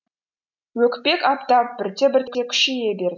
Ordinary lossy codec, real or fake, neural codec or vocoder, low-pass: none; real; none; 7.2 kHz